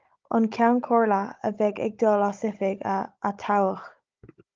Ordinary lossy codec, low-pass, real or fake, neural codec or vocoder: Opus, 24 kbps; 7.2 kHz; fake; codec, 16 kHz, 16 kbps, FunCodec, trained on Chinese and English, 50 frames a second